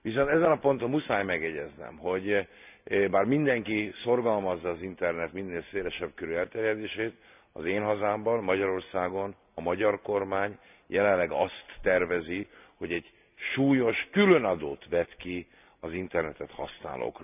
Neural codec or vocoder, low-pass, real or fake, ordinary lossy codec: none; 3.6 kHz; real; none